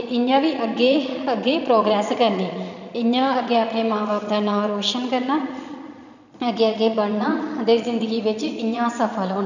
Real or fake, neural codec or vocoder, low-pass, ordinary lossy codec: fake; vocoder, 22.05 kHz, 80 mel bands, Vocos; 7.2 kHz; none